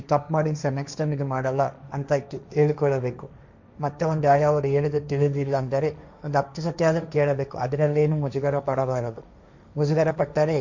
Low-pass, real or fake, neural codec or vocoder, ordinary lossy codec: 7.2 kHz; fake; codec, 16 kHz, 1.1 kbps, Voila-Tokenizer; none